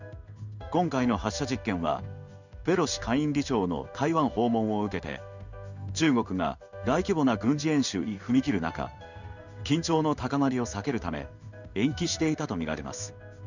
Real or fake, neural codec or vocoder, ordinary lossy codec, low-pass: fake; codec, 16 kHz in and 24 kHz out, 1 kbps, XY-Tokenizer; none; 7.2 kHz